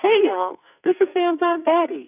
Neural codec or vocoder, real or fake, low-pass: codec, 44.1 kHz, 2.6 kbps, SNAC; fake; 3.6 kHz